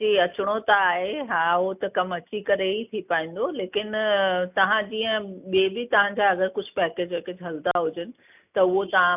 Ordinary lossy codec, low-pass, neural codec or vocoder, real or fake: none; 3.6 kHz; none; real